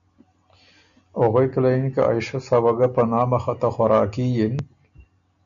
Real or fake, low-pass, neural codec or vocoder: real; 7.2 kHz; none